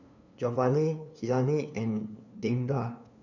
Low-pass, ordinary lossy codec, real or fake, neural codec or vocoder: 7.2 kHz; none; fake; codec, 16 kHz, 2 kbps, FunCodec, trained on LibriTTS, 25 frames a second